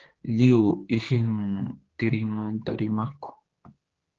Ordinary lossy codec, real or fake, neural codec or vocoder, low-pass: Opus, 16 kbps; fake; codec, 16 kHz, 2 kbps, X-Codec, HuBERT features, trained on general audio; 7.2 kHz